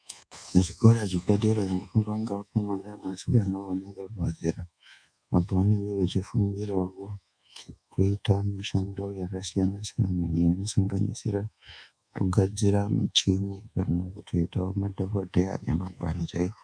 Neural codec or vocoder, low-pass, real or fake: codec, 24 kHz, 1.2 kbps, DualCodec; 9.9 kHz; fake